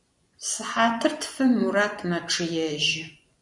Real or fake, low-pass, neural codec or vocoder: fake; 10.8 kHz; vocoder, 24 kHz, 100 mel bands, Vocos